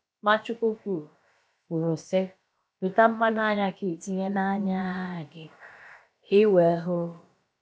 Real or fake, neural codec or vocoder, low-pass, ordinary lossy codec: fake; codec, 16 kHz, about 1 kbps, DyCAST, with the encoder's durations; none; none